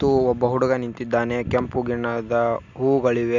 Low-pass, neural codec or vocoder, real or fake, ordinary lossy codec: 7.2 kHz; none; real; none